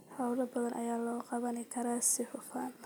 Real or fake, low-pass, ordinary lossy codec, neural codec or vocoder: real; none; none; none